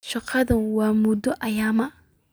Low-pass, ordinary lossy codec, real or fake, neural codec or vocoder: none; none; real; none